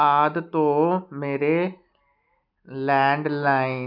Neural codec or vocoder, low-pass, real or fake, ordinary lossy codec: none; 5.4 kHz; real; none